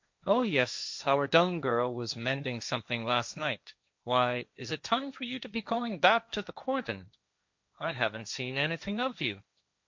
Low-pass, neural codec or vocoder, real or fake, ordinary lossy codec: 7.2 kHz; codec, 16 kHz, 1.1 kbps, Voila-Tokenizer; fake; MP3, 64 kbps